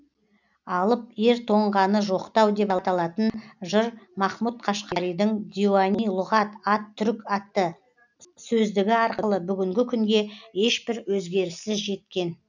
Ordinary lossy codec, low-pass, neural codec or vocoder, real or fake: none; 7.2 kHz; none; real